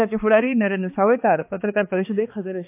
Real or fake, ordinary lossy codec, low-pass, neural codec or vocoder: fake; AAC, 24 kbps; 3.6 kHz; codec, 16 kHz, 2 kbps, X-Codec, HuBERT features, trained on balanced general audio